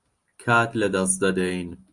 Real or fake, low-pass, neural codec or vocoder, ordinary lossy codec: real; 10.8 kHz; none; Opus, 32 kbps